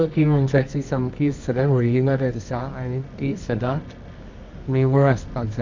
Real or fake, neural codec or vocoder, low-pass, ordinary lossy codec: fake; codec, 24 kHz, 0.9 kbps, WavTokenizer, medium music audio release; 7.2 kHz; MP3, 64 kbps